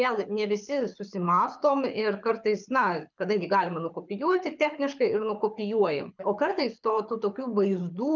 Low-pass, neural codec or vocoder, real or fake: 7.2 kHz; codec, 24 kHz, 6 kbps, HILCodec; fake